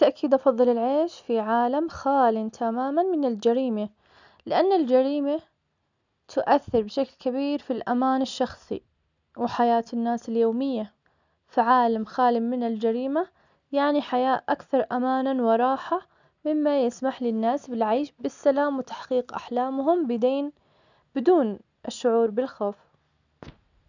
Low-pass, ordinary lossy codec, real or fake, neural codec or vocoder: 7.2 kHz; none; real; none